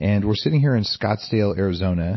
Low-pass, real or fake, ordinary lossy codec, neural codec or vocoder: 7.2 kHz; real; MP3, 24 kbps; none